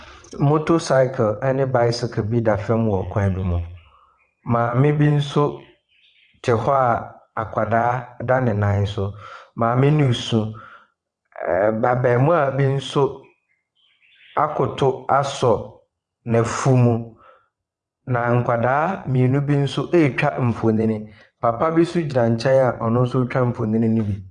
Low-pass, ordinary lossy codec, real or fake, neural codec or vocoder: 9.9 kHz; Opus, 64 kbps; fake; vocoder, 22.05 kHz, 80 mel bands, WaveNeXt